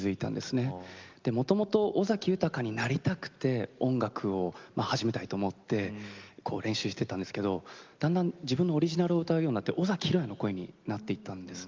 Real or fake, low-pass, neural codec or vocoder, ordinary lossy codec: real; 7.2 kHz; none; Opus, 32 kbps